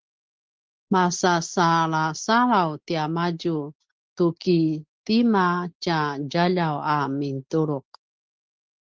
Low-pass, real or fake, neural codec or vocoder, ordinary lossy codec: 7.2 kHz; real; none; Opus, 16 kbps